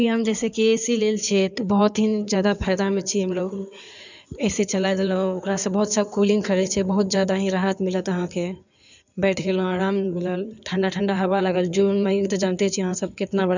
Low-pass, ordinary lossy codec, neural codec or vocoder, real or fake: 7.2 kHz; none; codec, 16 kHz in and 24 kHz out, 2.2 kbps, FireRedTTS-2 codec; fake